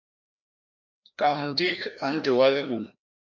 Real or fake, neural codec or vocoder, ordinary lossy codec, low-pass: fake; codec, 16 kHz, 1 kbps, FreqCodec, larger model; MP3, 64 kbps; 7.2 kHz